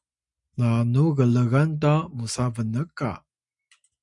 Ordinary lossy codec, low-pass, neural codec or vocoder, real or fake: MP3, 96 kbps; 10.8 kHz; none; real